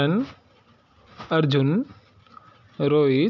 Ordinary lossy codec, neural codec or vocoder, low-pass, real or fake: none; none; 7.2 kHz; real